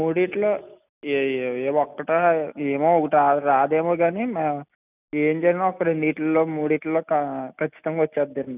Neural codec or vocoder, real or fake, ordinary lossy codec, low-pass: none; real; none; 3.6 kHz